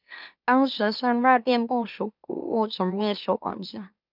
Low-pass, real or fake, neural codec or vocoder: 5.4 kHz; fake; autoencoder, 44.1 kHz, a latent of 192 numbers a frame, MeloTTS